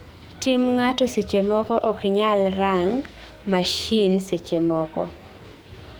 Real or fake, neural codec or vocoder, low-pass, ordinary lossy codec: fake; codec, 44.1 kHz, 2.6 kbps, SNAC; none; none